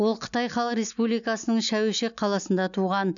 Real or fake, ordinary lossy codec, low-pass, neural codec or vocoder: real; none; 7.2 kHz; none